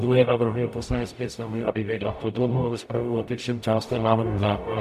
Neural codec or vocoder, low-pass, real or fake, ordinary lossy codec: codec, 44.1 kHz, 0.9 kbps, DAC; 14.4 kHz; fake; AAC, 96 kbps